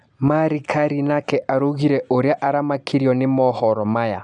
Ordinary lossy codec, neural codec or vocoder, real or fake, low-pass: none; none; real; 10.8 kHz